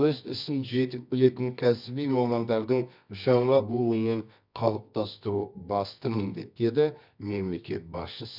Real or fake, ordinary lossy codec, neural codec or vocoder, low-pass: fake; none; codec, 24 kHz, 0.9 kbps, WavTokenizer, medium music audio release; 5.4 kHz